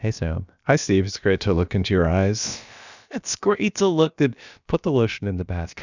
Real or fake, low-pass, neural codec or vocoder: fake; 7.2 kHz; codec, 16 kHz, about 1 kbps, DyCAST, with the encoder's durations